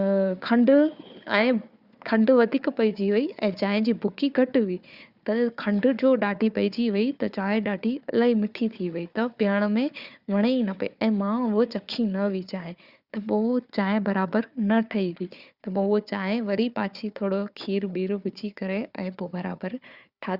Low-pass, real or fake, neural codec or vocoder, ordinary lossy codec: 5.4 kHz; fake; codec, 16 kHz, 4 kbps, FunCodec, trained on Chinese and English, 50 frames a second; Opus, 64 kbps